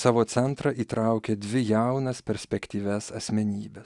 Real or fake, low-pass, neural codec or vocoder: real; 10.8 kHz; none